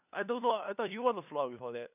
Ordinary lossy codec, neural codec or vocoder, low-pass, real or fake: none; codec, 16 kHz, 2 kbps, FunCodec, trained on LibriTTS, 25 frames a second; 3.6 kHz; fake